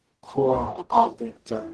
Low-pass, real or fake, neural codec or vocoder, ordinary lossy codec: 10.8 kHz; fake; codec, 44.1 kHz, 0.9 kbps, DAC; Opus, 16 kbps